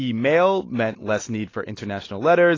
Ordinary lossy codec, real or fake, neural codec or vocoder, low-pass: AAC, 32 kbps; real; none; 7.2 kHz